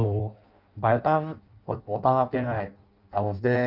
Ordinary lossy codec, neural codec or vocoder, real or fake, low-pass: Opus, 24 kbps; codec, 16 kHz in and 24 kHz out, 0.6 kbps, FireRedTTS-2 codec; fake; 5.4 kHz